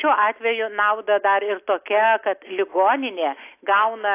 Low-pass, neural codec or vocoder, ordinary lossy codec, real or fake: 3.6 kHz; none; AAC, 24 kbps; real